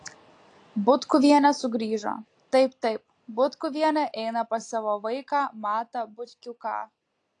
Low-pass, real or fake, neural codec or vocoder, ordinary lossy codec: 9.9 kHz; real; none; AAC, 48 kbps